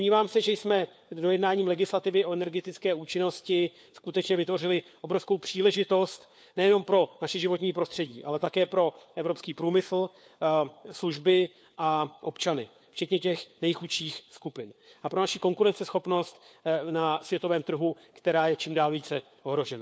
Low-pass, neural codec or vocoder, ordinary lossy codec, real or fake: none; codec, 16 kHz, 4 kbps, FunCodec, trained on LibriTTS, 50 frames a second; none; fake